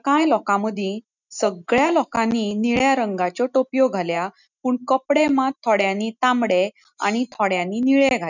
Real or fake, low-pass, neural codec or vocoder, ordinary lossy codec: real; 7.2 kHz; none; none